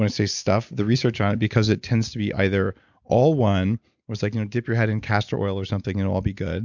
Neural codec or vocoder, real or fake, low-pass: none; real; 7.2 kHz